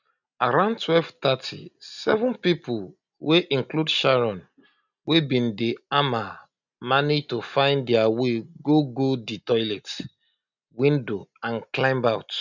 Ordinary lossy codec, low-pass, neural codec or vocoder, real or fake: none; 7.2 kHz; none; real